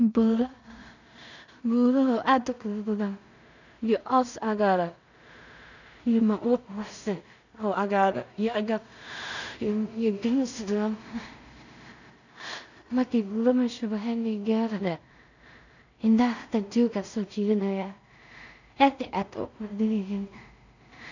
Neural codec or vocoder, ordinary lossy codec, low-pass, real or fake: codec, 16 kHz in and 24 kHz out, 0.4 kbps, LongCat-Audio-Codec, two codebook decoder; none; 7.2 kHz; fake